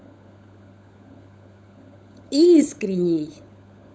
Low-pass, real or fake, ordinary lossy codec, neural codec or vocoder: none; fake; none; codec, 16 kHz, 16 kbps, FunCodec, trained on LibriTTS, 50 frames a second